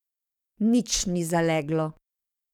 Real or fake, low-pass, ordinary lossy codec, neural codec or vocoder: fake; 19.8 kHz; none; codec, 44.1 kHz, 7.8 kbps, DAC